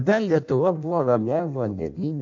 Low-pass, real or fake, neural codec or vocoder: 7.2 kHz; fake; codec, 16 kHz in and 24 kHz out, 0.6 kbps, FireRedTTS-2 codec